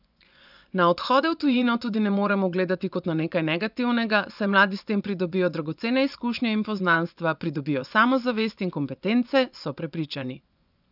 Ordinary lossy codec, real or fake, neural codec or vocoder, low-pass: none; fake; vocoder, 24 kHz, 100 mel bands, Vocos; 5.4 kHz